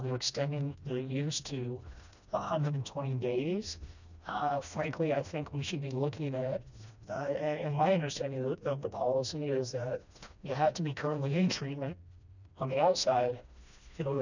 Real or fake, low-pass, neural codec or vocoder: fake; 7.2 kHz; codec, 16 kHz, 1 kbps, FreqCodec, smaller model